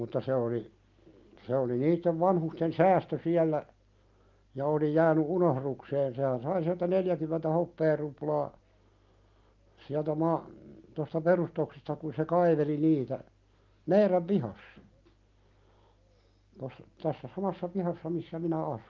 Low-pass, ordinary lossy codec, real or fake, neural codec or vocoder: 7.2 kHz; Opus, 24 kbps; real; none